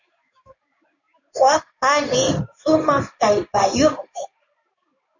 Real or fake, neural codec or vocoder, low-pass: fake; codec, 16 kHz in and 24 kHz out, 1 kbps, XY-Tokenizer; 7.2 kHz